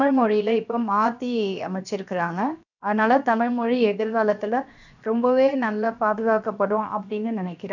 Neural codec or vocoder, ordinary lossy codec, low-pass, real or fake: codec, 16 kHz, 0.7 kbps, FocalCodec; none; 7.2 kHz; fake